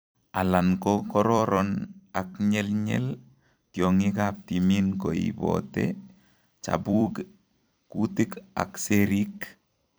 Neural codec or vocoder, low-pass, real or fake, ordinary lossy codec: vocoder, 44.1 kHz, 128 mel bands every 256 samples, BigVGAN v2; none; fake; none